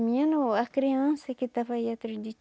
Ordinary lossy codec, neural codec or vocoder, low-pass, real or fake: none; none; none; real